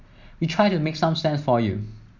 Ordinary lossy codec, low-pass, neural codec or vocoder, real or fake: none; 7.2 kHz; none; real